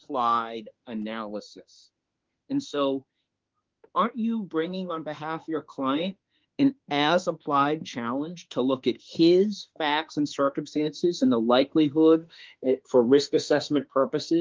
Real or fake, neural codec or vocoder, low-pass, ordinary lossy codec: fake; autoencoder, 48 kHz, 32 numbers a frame, DAC-VAE, trained on Japanese speech; 7.2 kHz; Opus, 32 kbps